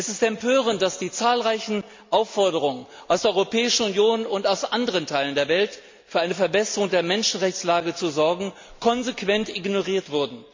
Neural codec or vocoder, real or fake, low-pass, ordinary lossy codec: none; real; 7.2 kHz; MP3, 64 kbps